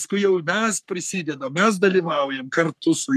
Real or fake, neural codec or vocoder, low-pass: fake; codec, 44.1 kHz, 3.4 kbps, Pupu-Codec; 14.4 kHz